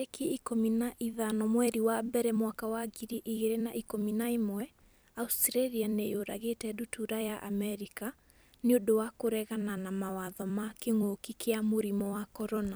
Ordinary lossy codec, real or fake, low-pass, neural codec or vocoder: none; fake; none; vocoder, 44.1 kHz, 128 mel bands every 256 samples, BigVGAN v2